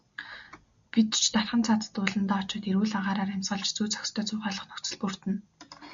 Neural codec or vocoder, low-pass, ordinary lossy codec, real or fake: none; 7.2 kHz; MP3, 64 kbps; real